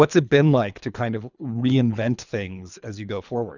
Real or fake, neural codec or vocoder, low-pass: fake; codec, 24 kHz, 3 kbps, HILCodec; 7.2 kHz